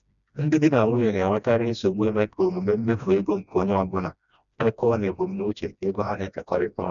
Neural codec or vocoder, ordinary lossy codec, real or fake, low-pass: codec, 16 kHz, 1 kbps, FreqCodec, smaller model; none; fake; 7.2 kHz